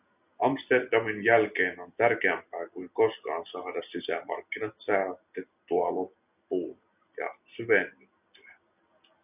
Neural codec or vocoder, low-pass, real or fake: none; 3.6 kHz; real